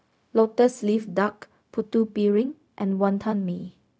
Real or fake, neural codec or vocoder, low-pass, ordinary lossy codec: fake; codec, 16 kHz, 0.4 kbps, LongCat-Audio-Codec; none; none